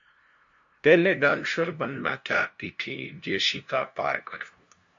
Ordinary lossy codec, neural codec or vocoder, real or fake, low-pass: MP3, 48 kbps; codec, 16 kHz, 0.5 kbps, FunCodec, trained on LibriTTS, 25 frames a second; fake; 7.2 kHz